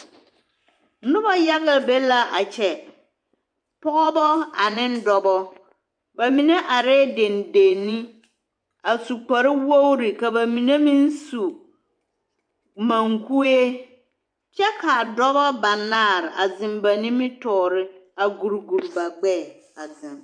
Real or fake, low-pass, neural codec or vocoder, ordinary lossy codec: fake; 9.9 kHz; vocoder, 24 kHz, 100 mel bands, Vocos; MP3, 96 kbps